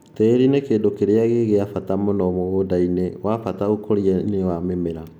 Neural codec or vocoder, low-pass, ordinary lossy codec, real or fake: none; 19.8 kHz; none; real